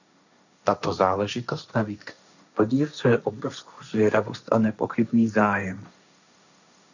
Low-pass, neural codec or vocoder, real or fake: 7.2 kHz; codec, 16 kHz, 1.1 kbps, Voila-Tokenizer; fake